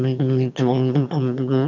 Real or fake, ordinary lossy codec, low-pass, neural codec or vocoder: fake; none; 7.2 kHz; autoencoder, 22.05 kHz, a latent of 192 numbers a frame, VITS, trained on one speaker